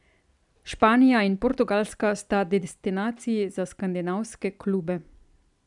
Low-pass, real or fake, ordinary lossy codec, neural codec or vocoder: 10.8 kHz; real; none; none